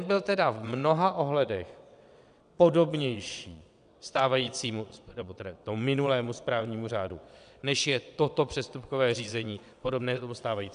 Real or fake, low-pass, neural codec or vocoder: fake; 9.9 kHz; vocoder, 22.05 kHz, 80 mel bands, WaveNeXt